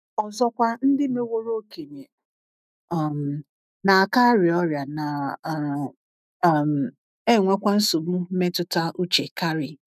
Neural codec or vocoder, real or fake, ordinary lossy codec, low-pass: autoencoder, 48 kHz, 128 numbers a frame, DAC-VAE, trained on Japanese speech; fake; none; 14.4 kHz